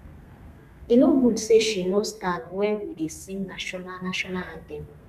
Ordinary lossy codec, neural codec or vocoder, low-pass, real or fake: none; codec, 32 kHz, 1.9 kbps, SNAC; 14.4 kHz; fake